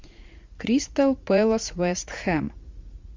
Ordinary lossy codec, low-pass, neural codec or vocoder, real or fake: MP3, 64 kbps; 7.2 kHz; vocoder, 44.1 kHz, 80 mel bands, Vocos; fake